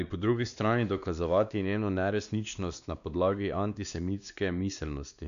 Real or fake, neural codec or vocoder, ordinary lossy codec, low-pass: fake; codec, 16 kHz, 2 kbps, X-Codec, WavLM features, trained on Multilingual LibriSpeech; none; 7.2 kHz